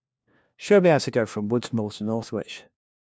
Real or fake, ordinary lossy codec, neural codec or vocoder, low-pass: fake; none; codec, 16 kHz, 1 kbps, FunCodec, trained on LibriTTS, 50 frames a second; none